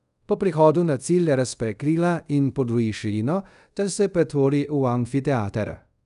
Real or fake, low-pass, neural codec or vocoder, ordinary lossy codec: fake; 10.8 kHz; codec, 24 kHz, 0.5 kbps, DualCodec; none